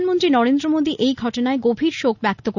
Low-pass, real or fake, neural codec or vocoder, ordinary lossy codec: 7.2 kHz; real; none; none